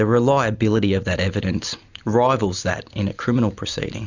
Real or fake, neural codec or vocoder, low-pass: real; none; 7.2 kHz